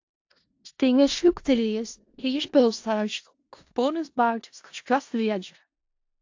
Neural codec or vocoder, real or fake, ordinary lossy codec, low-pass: codec, 16 kHz in and 24 kHz out, 0.4 kbps, LongCat-Audio-Codec, four codebook decoder; fake; AAC, 48 kbps; 7.2 kHz